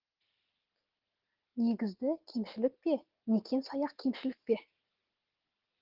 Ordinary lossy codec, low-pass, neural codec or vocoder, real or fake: Opus, 16 kbps; 5.4 kHz; none; real